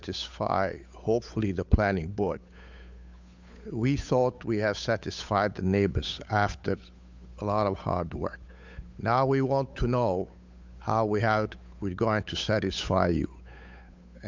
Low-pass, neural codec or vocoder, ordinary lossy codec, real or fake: 7.2 kHz; codec, 16 kHz, 8 kbps, FunCodec, trained on Chinese and English, 25 frames a second; MP3, 64 kbps; fake